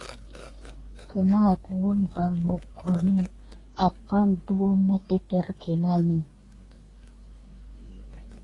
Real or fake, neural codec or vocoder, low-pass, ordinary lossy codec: fake; codec, 24 kHz, 1 kbps, SNAC; 10.8 kHz; AAC, 32 kbps